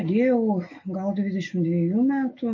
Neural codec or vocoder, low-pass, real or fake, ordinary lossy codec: none; 7.2 kHz; real; MP3, 32 kbps